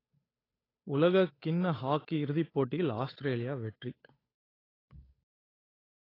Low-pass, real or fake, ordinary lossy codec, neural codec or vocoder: 5.4 kHz; fake; AAC, 24 kbps; codec, 16 kHz, 8 kbps, FunCodec, trained on Chinese and English, 25 frames a second